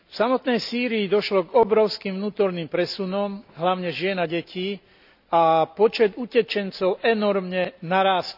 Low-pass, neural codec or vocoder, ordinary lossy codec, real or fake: 5.4 kHz; none; none; real